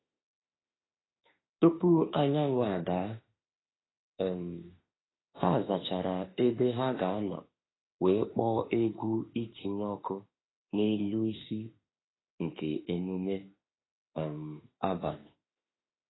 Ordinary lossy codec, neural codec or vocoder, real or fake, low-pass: AAC, 16 kbps; autoencoder, 48 kHz, 32 numbers a frame, DAC-VAE, trained on Japanese speech; fake; 7.2 kHz